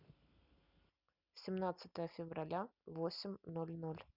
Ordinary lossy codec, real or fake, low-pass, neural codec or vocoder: none; real; 5.4 kHz; none